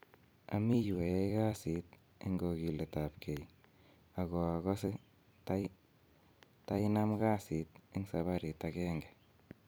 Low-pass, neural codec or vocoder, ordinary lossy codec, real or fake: none; vocoder, 44.1 kHz, 128 mel bands every 256 samples, BigVGAN v2; none; fake